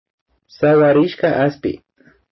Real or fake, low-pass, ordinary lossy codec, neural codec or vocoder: real; 7.2 kHz; MP3, 24 kbps; none